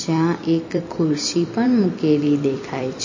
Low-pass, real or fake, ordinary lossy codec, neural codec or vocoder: 7.2 kHz; fake; MP3, 32 kbps; vocoder, 44.1 kHz, 128 mel bands every 256 samples, BigVGAN v2